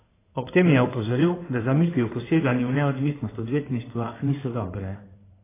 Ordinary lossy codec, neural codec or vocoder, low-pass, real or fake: AAC, 16 kbps; codec, 16 kHz in and 24 kHz out, 2.2 kbps, FireRedTTS-2 codec; 3.6 kHz; fake